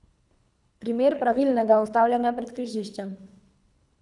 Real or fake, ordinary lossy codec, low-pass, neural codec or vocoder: fake; none; 10.8 kHz; codec, 24 kHz, 3 kbps, HILCodec